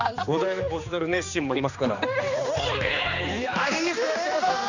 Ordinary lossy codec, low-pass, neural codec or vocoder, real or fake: none; 7.2 kHz; codec, 16 kHz, 2 kbps, X-Codec, HuBERT features, trained on general audio; fake